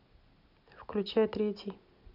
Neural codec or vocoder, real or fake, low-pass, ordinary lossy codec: none; real; 5.4 kHz; none